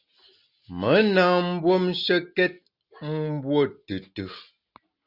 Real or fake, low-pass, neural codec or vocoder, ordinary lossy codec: real; 5.4 kHz; none; Opus, 64 kbps